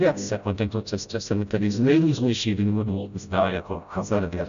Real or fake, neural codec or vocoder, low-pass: fake; codec, 16 kHz, 0.5 kbps, FreqCodec, smaller model; 7.2 kHz